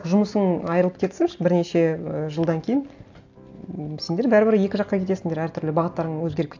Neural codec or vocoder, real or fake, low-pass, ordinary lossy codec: none; real; 7.2 kHz; AAC, 48 kbps